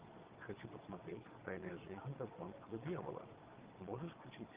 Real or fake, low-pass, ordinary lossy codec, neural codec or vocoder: fake; 3.6 kHz; Opus, 32 kbps; vocoder, 22.05 kHz, 80 mel bands, Vocos